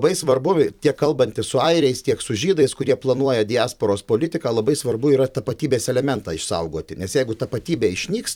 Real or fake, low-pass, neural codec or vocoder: fake; 19.8 kHz; vocoder, 44.1 kHz, 128 mel bands every 256 samples, BigVGAN v2